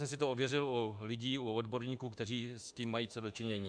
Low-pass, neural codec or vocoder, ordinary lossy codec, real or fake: 9.9 kHz; autoencoder, 48 kHz, 32 numbers a frame, DAC-VAE, trained on Japanese speech; MP3, 96 kbps; fake